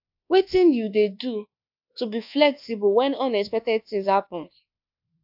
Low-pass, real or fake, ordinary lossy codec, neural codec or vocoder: 5.4 kHz; fake; AAC, 48 kbps; codec, 24 kHz, 1.2 kbps, DualCodec